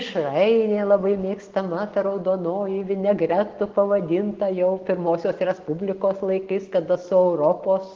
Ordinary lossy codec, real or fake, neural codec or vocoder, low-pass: Opus, 16 kbps; real; none; 7.2 kHz